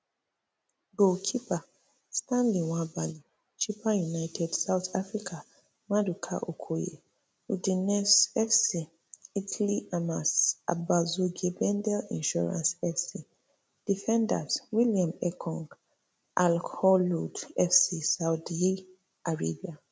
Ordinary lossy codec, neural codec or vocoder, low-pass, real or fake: none; none; none; real